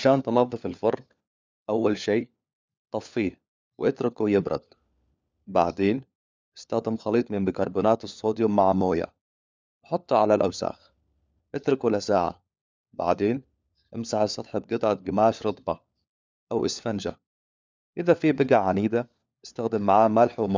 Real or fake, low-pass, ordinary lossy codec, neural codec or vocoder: fake; none; none; codec, 16 kHz, 4 kbps, FunCodec, trained on LibriTTS, 50 frames a second